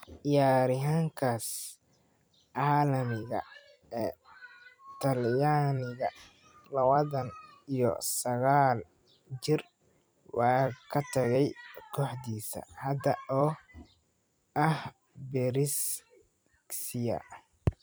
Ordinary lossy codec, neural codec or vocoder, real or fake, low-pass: none; vocoder, 44.1 kHz, 128 mel bands every 256 samples, BigVGAN v2; fake; none